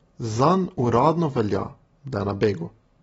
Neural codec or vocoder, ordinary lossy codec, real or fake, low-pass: none; AAC, 24 kbps; real; 19.8 kHz